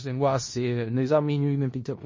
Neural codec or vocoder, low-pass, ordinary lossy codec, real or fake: codec, 16 kHz in and 24 kHz out, 0.4 kbps, LongCat-Audio-Codec, four codebook decoder; 7.2 kHz; MP3, 32 kbps; fake